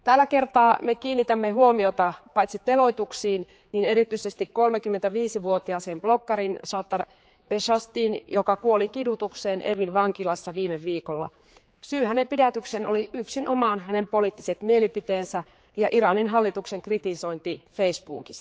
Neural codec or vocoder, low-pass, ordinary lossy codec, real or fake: codec, 16 kHz, 4 kbps, X-Codec, HuBERT features, trained on general audio; none; none; fake